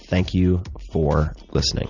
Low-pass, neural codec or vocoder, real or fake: 7.2 kHz; none; real